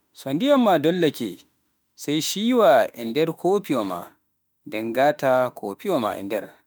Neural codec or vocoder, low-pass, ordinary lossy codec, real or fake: autoencoder, 48 kHz, 32 numbers a frame, DAC-VAE, trained on Japanese speech; none; none; fake